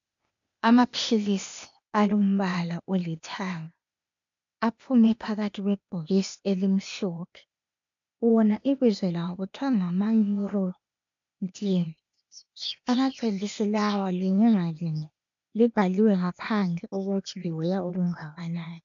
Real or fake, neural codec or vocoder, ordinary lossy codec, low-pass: fake; codec, 16 kHz, 0.8 kbps, ZipCodec; MP3, 64 kbps; 7.2 kHz